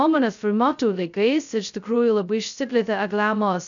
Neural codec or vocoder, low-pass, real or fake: codec, 16 kHz, 0.2 kbps, FocalCodec; 7.2 kHz; fake